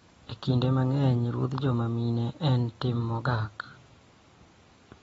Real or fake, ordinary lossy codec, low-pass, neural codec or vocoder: real; AAC, 24 kbps; 19.8 kHz; none